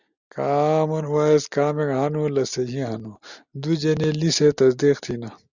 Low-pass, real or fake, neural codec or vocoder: 7.2 kHz; real; none